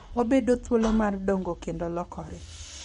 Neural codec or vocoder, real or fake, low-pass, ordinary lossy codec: codec, 44.1 kHz, 7.8 kbps, Pupu-Codec; fake; 19.8 kHz; MP3, 48 kbps